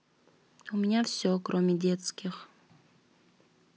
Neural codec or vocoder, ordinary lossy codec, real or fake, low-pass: none; none; real; none